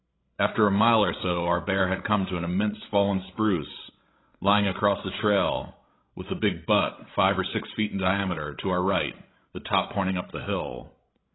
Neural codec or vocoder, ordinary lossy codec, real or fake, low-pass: codec, 16 kHz, 16 kbps, FreqCodec, larger model; AAC, 16 kbps; fake; 7.2 kHz